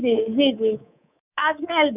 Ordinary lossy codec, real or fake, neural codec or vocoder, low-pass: none; fake; codec, 24 kHz, 3.1 kbps, DualCodec; 3.6 kHz